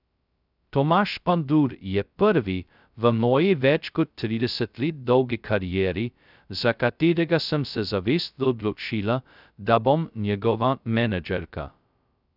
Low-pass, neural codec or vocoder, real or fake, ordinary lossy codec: 5.4 kHz; codec, 16 kHz, 0.2 kbps, FocalCodec; fake; none